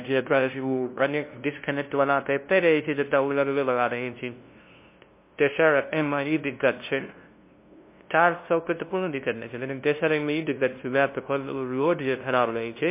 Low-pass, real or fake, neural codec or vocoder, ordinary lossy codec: 3.6 kHz; fake; codec, 16 kHz, 0.5 kbps, FunCodec, trained on LibriTTS, 25 frames a second; MP3, 24 kbps